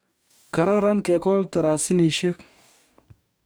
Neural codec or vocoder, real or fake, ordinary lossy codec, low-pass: codec, 44.1 kHz, 2.6 kbps, DAC; fake; none; none